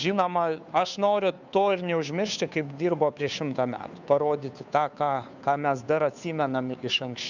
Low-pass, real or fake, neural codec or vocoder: 7.2 kHz; fake; codec, 16 kHz, 2 kbps, FunCodec, trained on Chinese and English, 25 frames a second